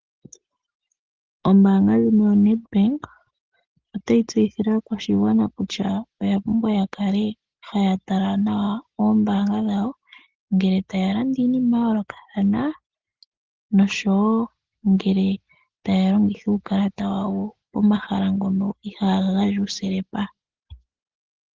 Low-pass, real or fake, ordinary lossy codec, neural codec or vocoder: 7.2 kHz; real; Opus, 16 kbps; none